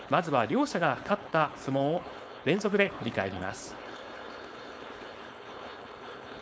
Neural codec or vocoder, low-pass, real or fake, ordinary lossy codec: codec, 16 kHz, 4.8 kbps, FACodec; none; fake; none